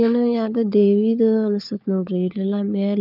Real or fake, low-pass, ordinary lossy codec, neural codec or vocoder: fake; 5.4 kHz; none; codec, 16 kHz, 4 kbps, FunCodec, trained on LibriTTS, 50 frames a second